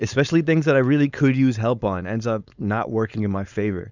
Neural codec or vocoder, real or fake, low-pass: codec, 16 kHz, 4.8 kbps, FACodec; fake; 7.2 kHz